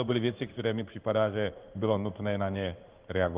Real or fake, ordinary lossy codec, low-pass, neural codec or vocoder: fake; Opus, 32 kbps; 3.6 kHz; codec, 16 kHz in and 24 kHz out, 1 kbps, XY-Tokenizer